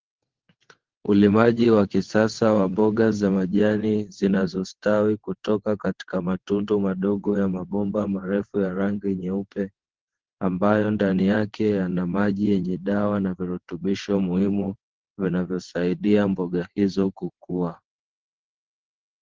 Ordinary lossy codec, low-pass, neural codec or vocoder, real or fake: Opus, 16 kbps; 7.2 kHz; vocoder, 22.05 kHz, 80 mel bands, WaveNeXt; fake